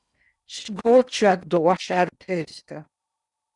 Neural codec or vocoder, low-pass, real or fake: codec, 16 kHz in and 24 kHz out, 0.8 kbps, FocalCodec, streaming, 65536 codes; 10.8 kHz; fake